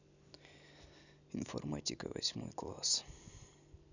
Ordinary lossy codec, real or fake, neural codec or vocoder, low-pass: none; real; none; 7.2 kHz